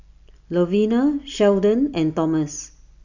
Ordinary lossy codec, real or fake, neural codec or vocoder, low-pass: none; real; none; 7.2 kHz